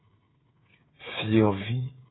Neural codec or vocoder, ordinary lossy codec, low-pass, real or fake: codec, 16 kHz, 8 kbps, FreqCodec, smaller model; AAC, 16 kbps; 7.2 kHz; fake